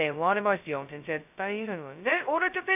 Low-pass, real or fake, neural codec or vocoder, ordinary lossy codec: 3.6 kHz; fake; codec, 16 kHz, 0.2 kbps, FocalCodec; none